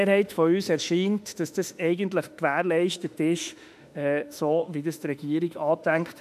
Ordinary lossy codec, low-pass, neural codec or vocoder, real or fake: none; 14.4 kHz; autoencoder, 48 kHz, 32 numbers a frame, DAC-VAE, trained on Japanese speech; fake